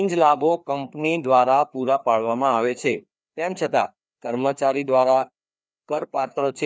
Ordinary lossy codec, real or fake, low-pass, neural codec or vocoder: none; fake; none; codec, 16 kHz, 2 kbps, FreqCodec, larger model